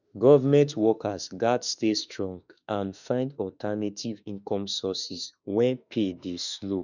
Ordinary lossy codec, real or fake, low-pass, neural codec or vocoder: none; fake; 7.2 kHz; autoencoder, 48 kHz, 32 numbers a frame, DAC-VAE, trained on Japanese speech